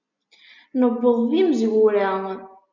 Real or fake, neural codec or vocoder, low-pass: real; none; 7.2 kHz